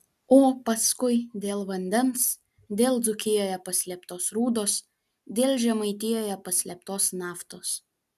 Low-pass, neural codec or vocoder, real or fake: 14.4 kHz; none; real